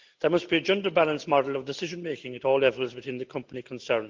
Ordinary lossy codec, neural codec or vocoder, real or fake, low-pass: Opus, 32 kbps; none; real; 7.2 kHz